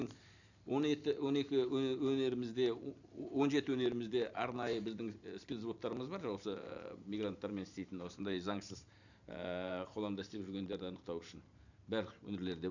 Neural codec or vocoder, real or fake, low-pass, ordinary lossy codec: vocoder, 44.1 kHz, 128 mel bands every 512 samples, BigVGAN v2; fake; 7.2 kHz; none